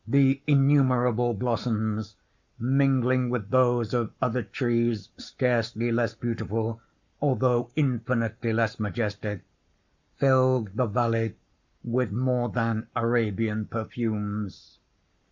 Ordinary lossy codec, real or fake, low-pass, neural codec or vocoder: Opus, 64 kbps; fake; 7.2 kHz; codec, 44.1 kHz, 7.8 kbps, Pupu-Codec